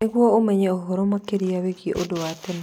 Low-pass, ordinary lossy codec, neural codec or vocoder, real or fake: 19.8 kHz; none; none; real